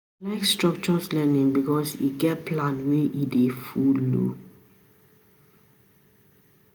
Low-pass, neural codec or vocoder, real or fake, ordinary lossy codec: none; none; real; none